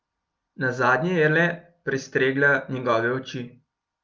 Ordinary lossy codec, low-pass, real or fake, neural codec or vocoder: Opus, 24 kbps; 7.2 kHz; real; none